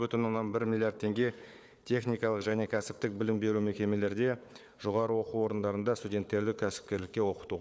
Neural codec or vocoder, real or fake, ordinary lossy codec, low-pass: codec, 16 kHz, 4 kbps, FunCodec, trained on Chinese and English, 50 frames a second; fake; none; none